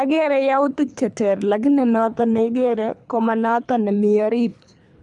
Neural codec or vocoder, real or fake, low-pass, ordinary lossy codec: codec, 24 kHz, 3 kbps, HILCodec; fake; 10.8 kHz; none